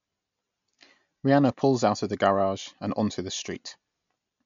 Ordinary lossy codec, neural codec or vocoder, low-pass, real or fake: MP3, 64 kbps; none; 7.2 kHz; real